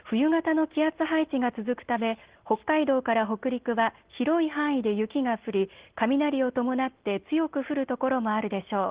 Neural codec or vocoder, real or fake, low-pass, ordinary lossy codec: none; real; 3.6 kHz; Opus, 16 kbps